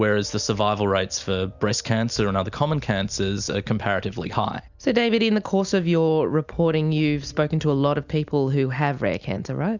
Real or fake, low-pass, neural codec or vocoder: real; 7.2 kHz; none